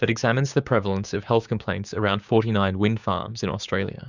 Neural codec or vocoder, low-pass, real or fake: codec, 16 kHz, 6 kbps, DAC; 7.2 kHz; fake